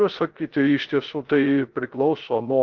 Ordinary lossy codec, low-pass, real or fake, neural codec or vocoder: Opus, 16 kbps; 7.2 kHz; fake; codec, 16 kHz, 0.3 kbps, FocalCodec